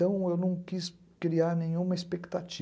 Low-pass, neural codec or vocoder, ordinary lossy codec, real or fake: none; none; none; real